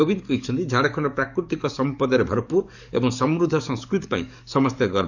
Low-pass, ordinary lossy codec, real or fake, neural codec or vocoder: 7.2 kHz; none; fake; codec, 44.1 kHz, 7.8 kbps, DAC